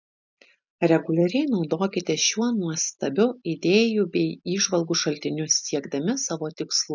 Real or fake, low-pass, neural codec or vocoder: real; 7.2 kHz; none